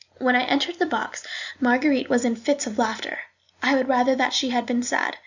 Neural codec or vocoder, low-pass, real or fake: none; 7.2 kHz; real